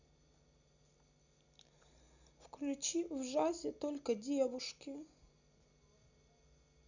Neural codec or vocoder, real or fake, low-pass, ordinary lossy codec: none; real; 7.2 kHz; none